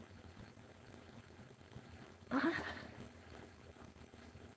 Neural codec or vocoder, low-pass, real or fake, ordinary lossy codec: codec, 16 kHz, 4.8 kbps, FACodec; none; fake; none